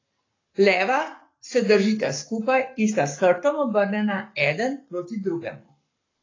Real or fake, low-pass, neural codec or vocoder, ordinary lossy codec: fake; 7.2 kHz; codec, 44.1 kHz, 7.8 kbps, Pupu-Codec; AAC, 32 kbps